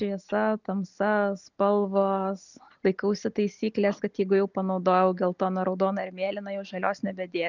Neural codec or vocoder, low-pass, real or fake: none; 7.2 kHz; real